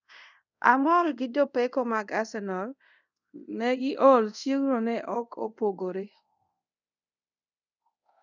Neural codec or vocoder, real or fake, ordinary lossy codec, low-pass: codec, 24 kHz, 0.5 kbps, DualCodec; fake; none; 7.2 kHz